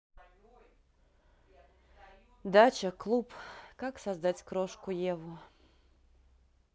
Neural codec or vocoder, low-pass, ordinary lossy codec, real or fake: none; none; none; real